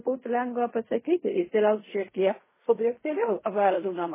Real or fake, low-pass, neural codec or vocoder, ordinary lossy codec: fake; 3.6 kHz; codec, 16 kHz in and 24 kHz out, 0.4 kbps, LongCat-Audio-Codec, fine tuned four codebook decoder; MP3, 16 kbps